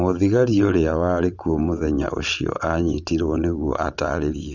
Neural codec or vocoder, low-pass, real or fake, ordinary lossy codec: vocoder, 22.05 kHz, 80 mel bands, WaveNeXt; 7.2 kHz; fake; none